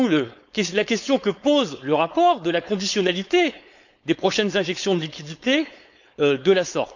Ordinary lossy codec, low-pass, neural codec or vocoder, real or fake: none; 7.2 kHz; codec, 16 kHz, 4.8 kbps, FACodec; fake